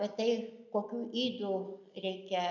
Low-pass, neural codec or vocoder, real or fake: 7.2 kHz; none; real